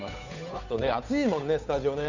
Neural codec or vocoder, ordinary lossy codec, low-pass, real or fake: codec, 16 kHz, 8 kbps, FunCodec, trained on Chinese and English, 25 frames a second; none; 7.2 kHz; fake